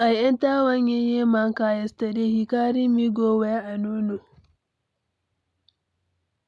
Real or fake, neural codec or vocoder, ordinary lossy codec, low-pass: real; none; none; none